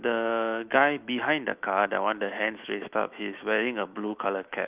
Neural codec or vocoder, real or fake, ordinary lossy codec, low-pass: autoencoder, 48 kHz, 128 numbers a frame, DAC-VAE, trained on Japanese speech; fake; Opus, 24 kbps; 3.6 kHz